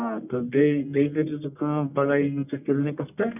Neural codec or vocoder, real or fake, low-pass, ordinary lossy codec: codec, 44.1 kHz, 1.7 kbps, Pupu-Codec; fake; 3.6 kHz; none